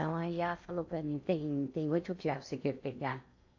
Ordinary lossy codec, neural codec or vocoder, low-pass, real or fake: AAC, 48 kbps; codec, 16 kHz in and 24 kHz out, 0.8 kbps, FocalCodec, streaming, 65536 codes; 7.2 kHz; fake